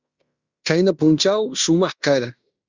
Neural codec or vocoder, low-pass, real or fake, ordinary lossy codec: codec, 16 kHz in and 24 kHz out, 0.9 kbps, LongCat-Audio-Codec, fine tuned four codebook decoder; 7.2 kHz; fake; Opus, 64 kbps